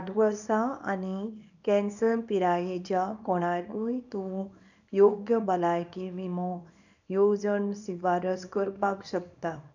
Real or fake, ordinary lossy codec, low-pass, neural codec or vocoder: fake; none; 7.2 kHz; codec, 24 kHz, 0.9 kbps, WavTokenizer, small release